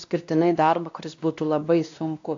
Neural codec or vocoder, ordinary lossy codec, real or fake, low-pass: codec, 16 kHz, 1 kbps, X-Codec, WavLM features, trained on Multilingual LibriSpeech; AAC, 64 kbps; fake; 7.2 kHz